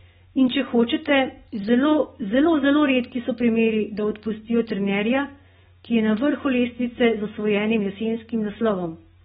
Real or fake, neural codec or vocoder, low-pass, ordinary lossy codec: real; none; 7.2 kHz; AAC, 16 kbps